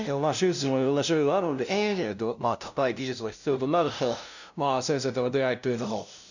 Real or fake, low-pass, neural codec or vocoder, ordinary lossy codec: fake; 7.2 kHz; codec, 16 kHz, 0.5 kbps, FunCodec, trained on LibriTTS, 25 frames a second; none